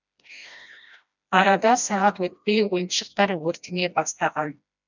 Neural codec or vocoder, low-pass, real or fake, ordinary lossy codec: codec, 16 kHz, 1 kbps, FreqCodec, smaller model; 7.2 kHz; fake; none